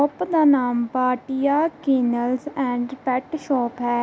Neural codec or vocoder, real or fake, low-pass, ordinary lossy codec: none; real; none; none